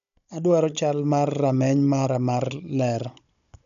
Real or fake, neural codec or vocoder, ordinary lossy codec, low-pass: fake; codec, 16 kHz, 16 kbps, FunCodec, trained on Chinese and English, 50 frames a second; none; 7.2 kHz